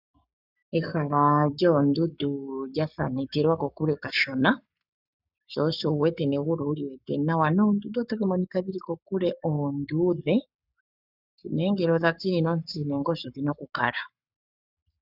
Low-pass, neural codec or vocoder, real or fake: 5.4 kHz; codec, 44.1 kHz, 7.8 kbps, Pupu-Codec; fake